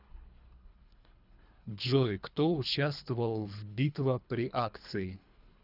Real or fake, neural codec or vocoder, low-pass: fake; codec, 24 kHz, 3 kbps, HILCodec; 5.4 kHz